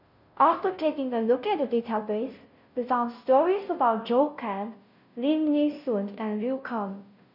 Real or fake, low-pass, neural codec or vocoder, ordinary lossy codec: fake; 5.4 kHz; codec, 16 kHz, 0.5 kbps, FunCodec, trained on Chinese and English, 25 frames a second; none